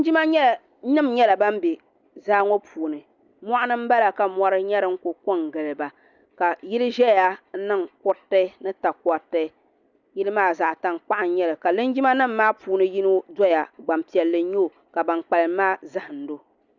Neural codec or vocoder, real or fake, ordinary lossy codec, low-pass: none; real; Opus, 64 kbps; 7.2 kHz